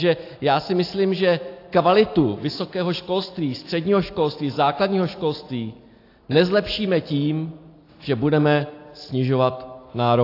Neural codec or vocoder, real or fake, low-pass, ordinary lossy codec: none; real; 5.4 kHz; AAC, 32 kbps